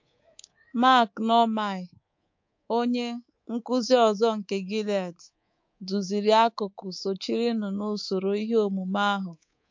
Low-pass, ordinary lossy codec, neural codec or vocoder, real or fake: 7.2 kHz; MP3, 48 kbps; codec, 24 kHz, 3.1 kbps, DualCodec; fake